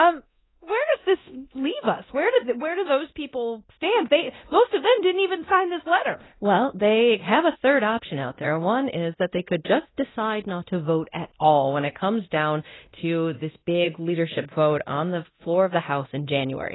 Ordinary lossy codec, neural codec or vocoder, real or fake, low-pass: AAC, 16 kbps; codec, 24 kHz, 0.9 kbps, DualCodec; fake; 7.2 kHz